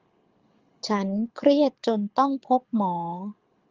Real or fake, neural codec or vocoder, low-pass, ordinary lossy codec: fake; codec, 24 kHz, 6 kbps, HILCodec; 7.2 kHz; Opus, 32 kbps